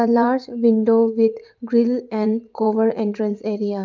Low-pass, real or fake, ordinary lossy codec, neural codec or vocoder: 7.2 kHz; fake; Opus, 32 kbps; vocoder, 44.1 kHz, 80 mel bands, Vocos